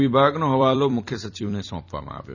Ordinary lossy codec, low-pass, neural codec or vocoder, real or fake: none; 7.2 kHz; vocoder, 44.1 kHz, 128 mel bands every 256 samples, BigVGAN v2; fake